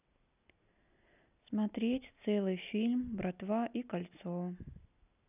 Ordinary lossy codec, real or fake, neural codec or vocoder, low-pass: none; real; none; 3.6 kHz